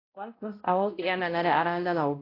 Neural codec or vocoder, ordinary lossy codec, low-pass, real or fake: codec, 16 kHz, 0.5 kbps, X-Codec, HuBERT features, trained on balanced general audio; AAC, 24 kbps; 5.4 kHz; fake